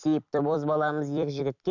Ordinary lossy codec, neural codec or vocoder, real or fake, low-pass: none; vocoder, 44.1 kHz, 128 mel bands every 256 samples, BigVGAN v2; fake; 7.2 kHz